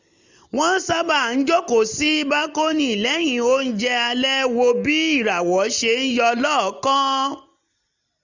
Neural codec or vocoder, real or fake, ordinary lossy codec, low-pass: none; real; none; 7.2 kHz